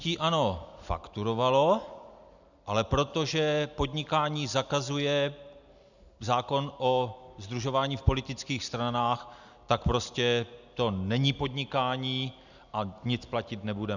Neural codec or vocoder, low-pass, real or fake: none; 7.2 kHz; real